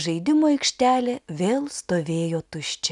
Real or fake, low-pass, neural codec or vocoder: real; 10.8 kHz; none